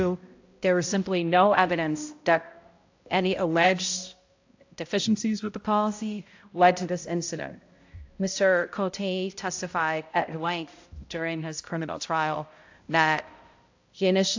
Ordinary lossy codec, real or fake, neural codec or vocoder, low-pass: AAC, 48 kbps; fake; codec, 16 kHz, 0.5 kbps, X-Codec, HuBERT features, trained on balanced general audio; 7.2 kHz